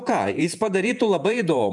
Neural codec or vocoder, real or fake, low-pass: vocoder, 48 kHz, 128 mel bands, Vocos; fake; 10.8 kHz